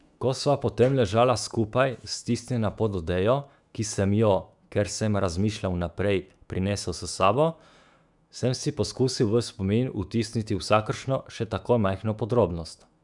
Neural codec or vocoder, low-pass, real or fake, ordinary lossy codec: autoencoder, 48 kHz, 128 numbers a frame, DAC-VAE, trained on Japanese speech; 10.8 kHz; fake; none